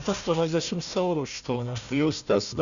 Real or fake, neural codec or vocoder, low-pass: fake; codec, 16 kHz, 1 kbps, FunCodec, trained on Chinese and English, 50 frames a second; 7.2 kHz